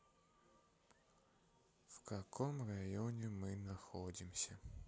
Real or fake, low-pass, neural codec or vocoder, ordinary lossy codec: real; none; none; none